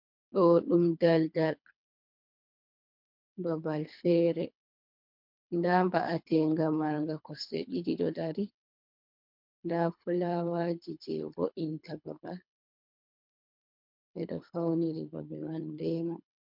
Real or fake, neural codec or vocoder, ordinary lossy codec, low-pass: fake; codec, 24 kHz, 3 kbps, HILCodec; MP3, 48 kbps; 5.4 kHz